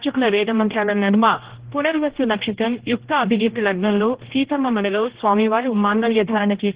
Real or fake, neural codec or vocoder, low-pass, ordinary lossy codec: fake; codec, 16 kHz, 1 kbps, X-Codec, HuBERT features, trained on general audio; 3.6 kHz; Opus, 16 kbps